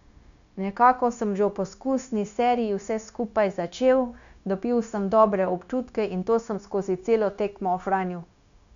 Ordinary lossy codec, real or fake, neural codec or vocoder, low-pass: none; fake; codec, 16 kHz, 0.9 kbps, LongCat-Audio-Codec; 7.2 kHz